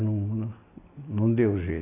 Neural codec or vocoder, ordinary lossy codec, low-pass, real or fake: none; AAC, 24 kbps; 3.6 kHz; real